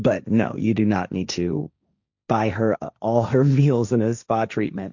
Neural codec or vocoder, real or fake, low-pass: codec, 16 kHz, 1.1 kbps, Voila-Tokenizer; fake; 7.2 kHz